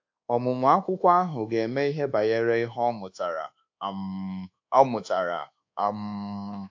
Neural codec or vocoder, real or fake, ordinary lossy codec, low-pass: codec, 24 kHz, 1.2 kbps, DualCodec; fake; AAC, 48 kbps; 7.2 kHz